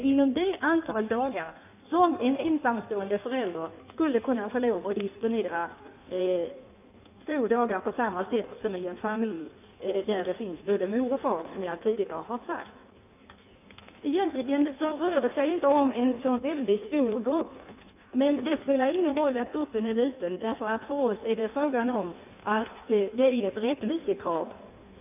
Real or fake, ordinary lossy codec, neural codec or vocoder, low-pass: fake; none; codec, 16 kHz in and 24 kHz out, 1.1 kbps, FireRedTTS-2 codec; 3.6 kHz